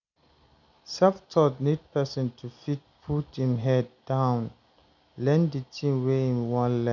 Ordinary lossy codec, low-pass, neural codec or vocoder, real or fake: none; 7.2 kHz; none; real